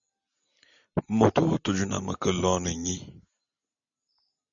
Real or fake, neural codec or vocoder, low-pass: real; none; 7.2 kHz